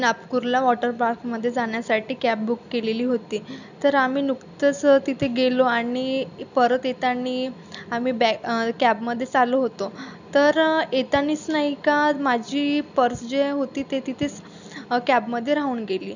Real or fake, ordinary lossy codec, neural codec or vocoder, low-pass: real; none; none; 7.2 kHz